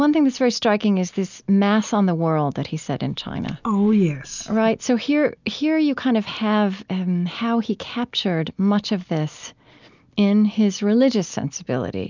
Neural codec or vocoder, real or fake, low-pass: none; real; 7.2 kHz